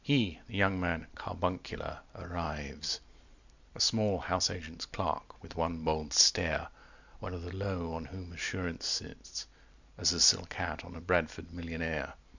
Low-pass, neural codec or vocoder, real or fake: 7.2 kHz; none; real